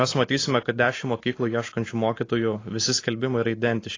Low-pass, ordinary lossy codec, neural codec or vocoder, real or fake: 7.2 kHz; AAC, 32 kbps; none; real